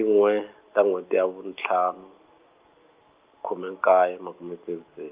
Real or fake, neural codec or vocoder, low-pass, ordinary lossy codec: real; none; 3.6 kHz; Opus, 24 kbps